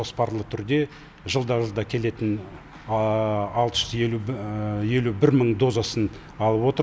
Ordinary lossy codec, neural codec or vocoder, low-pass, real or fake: none; none; none; real